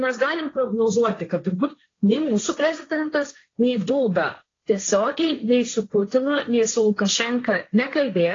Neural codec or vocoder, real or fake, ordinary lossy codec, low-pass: codec, 16 kHz, 1.1 kbps, Voila-Tokenizer; fake; AAC, 32 kbps; 7.2 kHz